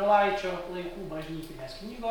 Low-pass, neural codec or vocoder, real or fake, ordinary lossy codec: 19.8 kHz; none; real; MP3, 96 kbps